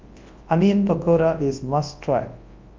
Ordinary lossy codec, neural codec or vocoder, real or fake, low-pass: Opus, 24 kbps; codec, 24 kHz, 0.9 kbps, WavTokenizer, large speech release; fake; 7.2 kHz